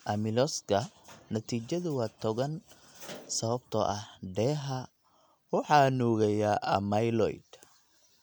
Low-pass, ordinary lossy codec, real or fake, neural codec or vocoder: none; none; real; none